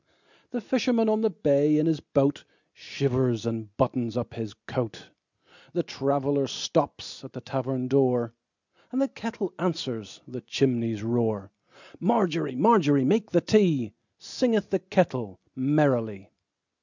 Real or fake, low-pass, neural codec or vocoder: real; 7.2 kHz; none